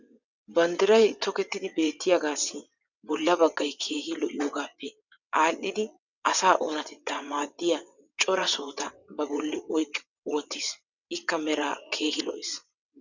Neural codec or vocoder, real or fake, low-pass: vocoder, 22.05 kHz, 80 mel bands, WaveNeXt; fake; 7.2 kHz